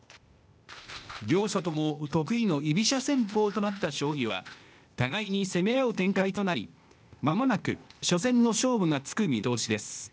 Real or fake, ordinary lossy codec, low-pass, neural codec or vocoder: fake; none; none; codec, 16 kHz, 0.8 kbps, ZipCodec